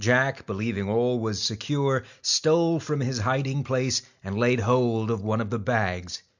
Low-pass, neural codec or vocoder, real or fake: 7.2 kHz; none; real